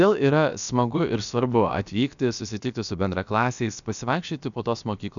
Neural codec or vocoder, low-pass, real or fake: codec, 16 kHz, about 1 kbps, DyCAST, with the encoder's durations; 7.2 kHz; fake